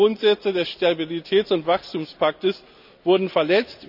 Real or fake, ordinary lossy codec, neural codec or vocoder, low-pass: real; none; none; 5.4 kHz